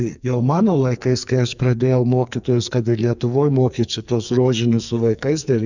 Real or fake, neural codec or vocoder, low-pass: fake; codec, 44.1 kHz, 2.6 kbps, SNAC; 7.2 kHz